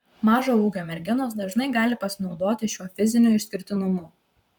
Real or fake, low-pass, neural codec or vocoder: fake; 19.8 kHz; vocoder, 44.1 kHz, 128 mel bands, Pupu-Vocoder